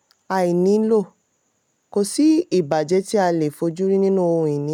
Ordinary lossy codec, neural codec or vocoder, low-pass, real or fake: none; none; 19.8 kHz; real